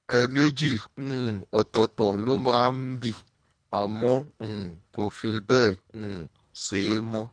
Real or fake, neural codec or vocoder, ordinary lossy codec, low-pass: fake; codec, 24 kHz, 1.5 kbps, HILCodec; none; 9.9 kHz